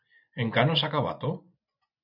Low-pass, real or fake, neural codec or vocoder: 5.4 kHz; fake; vocoder, 24 kHz, 100 mel bands, Vocos